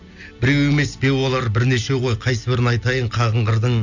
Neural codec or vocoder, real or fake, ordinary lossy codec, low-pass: none; real; none; 7.2 kHz